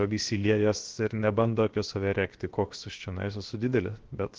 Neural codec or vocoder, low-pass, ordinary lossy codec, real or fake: codec, 16 kHz, 0.7 kbps, FocalCodec; 7.2 kHz; Opus, 16 kbps; fake